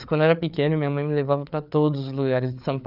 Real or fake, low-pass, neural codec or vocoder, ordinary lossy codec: fake; 5.4 kHz; codec, 16 kHz, 4 kbps, FreqCodec, larger model; none